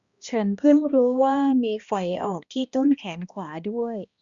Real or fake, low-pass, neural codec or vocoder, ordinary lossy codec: fake; 7.2 kHz; codec, 16 kHz, 1 kbps, X-Codec, HuBERT features, trained on balanced general audio; Opus, 64 kbps